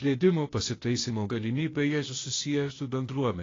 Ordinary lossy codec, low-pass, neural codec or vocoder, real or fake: AAC, 32 kbps; 7.2 kHz; codec, 16 kHz, 0.8 kbps, ZipCodec; fake